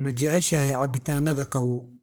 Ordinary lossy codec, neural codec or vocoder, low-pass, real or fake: none; codec, 44.1 kHz, 1.7 kbps, Pupu-Codec; none; fake